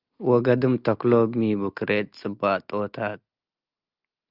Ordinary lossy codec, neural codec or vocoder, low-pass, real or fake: Opus, 24 kbps; none; 5.4 kHz; real